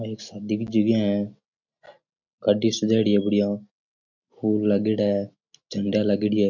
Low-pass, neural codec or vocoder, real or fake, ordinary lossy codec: 7.2 kHz; none; real; MP3, 48 kbps